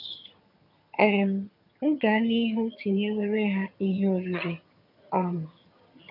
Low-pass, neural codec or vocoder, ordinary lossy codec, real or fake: 5.4 kHz; vocoder, 22.05 kHz, 80 mel bands, HiFi-GAN; none; fake